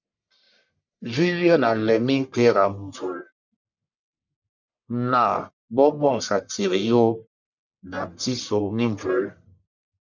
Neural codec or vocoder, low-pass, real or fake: codec, 44.1 kHz, 1.7 kbps, Pupu-Codec; 7.2 kHz; fake